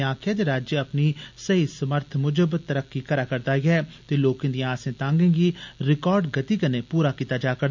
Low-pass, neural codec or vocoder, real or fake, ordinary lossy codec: 7.2 kHz; none; real; MP3, 64 kbps